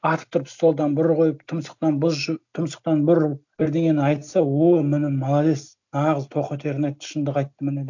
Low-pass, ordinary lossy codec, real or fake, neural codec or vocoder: 7.2 kHz; none; real; none